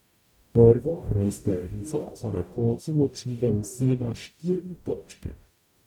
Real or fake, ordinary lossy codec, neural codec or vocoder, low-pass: fake; none; codec, 44.1 kHz, 0.9 kbps, DAC; 19.8 kHz